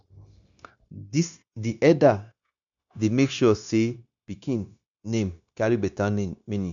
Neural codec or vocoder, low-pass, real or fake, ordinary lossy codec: codec, 16 kHz, 0.9 kbps, LongCat-Audio-Codec; 7.2 kHz; fake; none